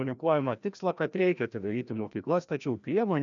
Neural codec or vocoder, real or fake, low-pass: codec, 16 kHz, 1 kbps, FreqCodec, larger model; fake; 7.2 kHz